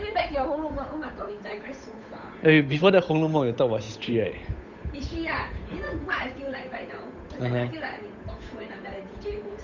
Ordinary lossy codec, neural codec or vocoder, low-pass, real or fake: none; codec, 16 kHz, 8 kbps, FunCodec, trained on Chinese and English, 25 frames a second; 7.2 kHz; fake